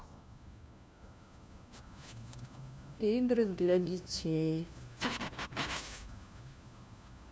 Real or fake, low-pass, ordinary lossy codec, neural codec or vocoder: fake; none; none; codec, 16 kHz, 1 kbps, FunCodec, trained on LibriTTS, 50 frames a second